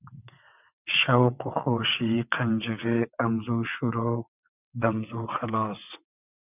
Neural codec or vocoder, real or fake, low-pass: vocoder, 22.05 kHz, 80 mel bands, WaveNeXt; fake; 3.6 kHz